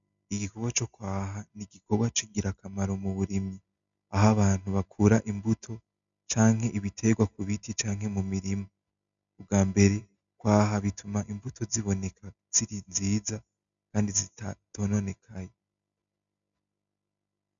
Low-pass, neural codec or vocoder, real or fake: 7.2 kHz; none; real